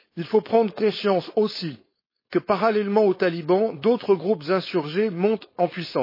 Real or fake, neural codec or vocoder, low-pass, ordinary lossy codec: fake; codec, 16 kHz, 4.8 kbps, FACodec; 5.4 kHz; MP3, 24 kbps